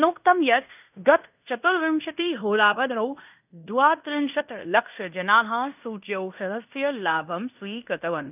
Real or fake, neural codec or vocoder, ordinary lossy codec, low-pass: fake; codec, 16 kHz in and 24 kHz out, 0.9 kbps, LongCat-Audio-Codec, fine tuned four codebook decoder; none; 3.6 kHz